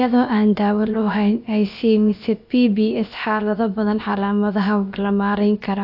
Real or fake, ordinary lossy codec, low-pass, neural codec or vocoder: fake; AAC, 48 kbps; 5.4 kHz; codec, 16 kHz, about 1 kbps, DyCAST, with the encoder's durations